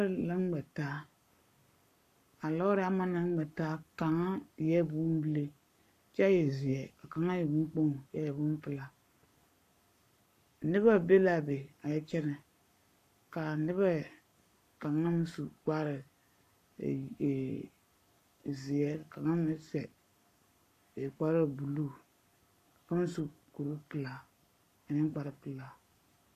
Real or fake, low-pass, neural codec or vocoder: fake; 14.4 kHz; codec, 44.1 kHz, 7.8 kbps, Pupu-Codec